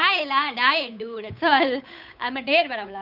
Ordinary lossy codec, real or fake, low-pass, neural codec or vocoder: none; fake; 5.4 kHz; codec, 16 kHz, 8 kbps, FunCodec, trained on Chinese and English, 25 frames a second